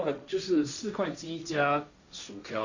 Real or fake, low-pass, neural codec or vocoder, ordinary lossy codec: fake; 7.2 kHz; codec, 16 kHz, 1.1 kbps, Voila-Tokenizer; none